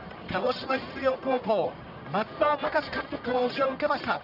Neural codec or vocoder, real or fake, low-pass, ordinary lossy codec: codec, 44.1 kHz, 1.7 kbps, Pupu-Codec; fake; 5.4 kHz; none